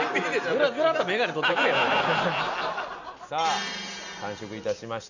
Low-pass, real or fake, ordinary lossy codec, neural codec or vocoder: 7.2 kHz; real; none; none